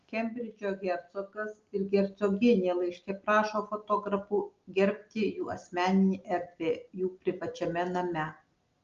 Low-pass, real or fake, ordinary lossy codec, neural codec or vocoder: 7.2 kHz; real; Opus, 32 kbps; none